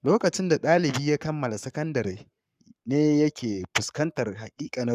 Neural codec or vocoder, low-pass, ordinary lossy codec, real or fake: codec, 44.1 kHz, 7.8 kbps, Pupu-Codec; 14.4 kHz; none; fake